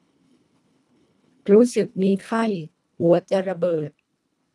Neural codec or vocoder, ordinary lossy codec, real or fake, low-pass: codec, 24 kHz, 1.5 kbps, HILCodec; none; fake; none